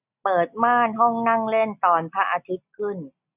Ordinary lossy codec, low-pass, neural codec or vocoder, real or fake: none; 3.6 kHz; none; real